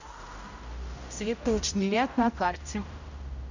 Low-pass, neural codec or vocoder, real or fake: 7.2 kHz; codec, 16 kHz, 0.5 kbps, X-Codec, HuBERT features, trained on general audio; fake